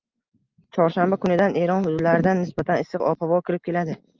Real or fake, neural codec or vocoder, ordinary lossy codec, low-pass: real; none; Opus, 24 kbps; 7.2 kHz